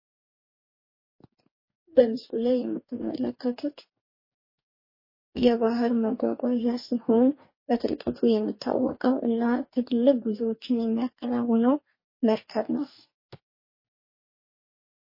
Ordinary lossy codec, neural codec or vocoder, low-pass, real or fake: MP3, 24 kbps; codec, 44.1 kHz, 2.6 kbps, DAC; 5.4 kHz; fake